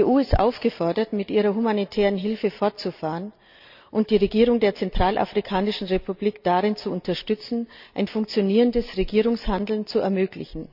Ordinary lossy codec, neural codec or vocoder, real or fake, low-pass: none; none; real; 5.4 kHz